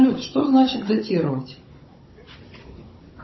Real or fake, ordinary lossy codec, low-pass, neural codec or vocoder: fake; MP3, 24 kbps; 7.2 kHz; codec, 16 kHz, 16 kbps, FunCodec, trained on Chinese and English, 50 frames a second